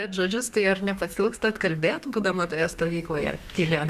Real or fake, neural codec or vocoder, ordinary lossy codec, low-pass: fake; codec, 32 kHz, 1.9 kbps, SNAC; Opus, 64 kbps; 14.4 kHz